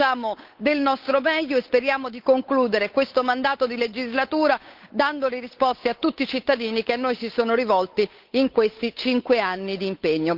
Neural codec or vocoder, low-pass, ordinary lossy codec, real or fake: codec, 16 kHz, 8 kbps, FunCodec, trained on Chinese and English, 25 frames a second; 5.4 kHz; Opus, 24 kbps; fake